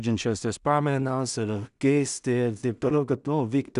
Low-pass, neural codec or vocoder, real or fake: 10.8 kHz; codec, 16 kHz in and 24 kHz out, 0.4 kbps, LongCat-Audio-Codec, two codebook decoder; fake